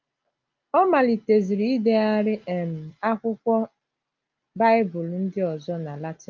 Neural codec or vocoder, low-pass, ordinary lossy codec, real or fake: none; 7.2 kHz; Opus, 24 kbps; real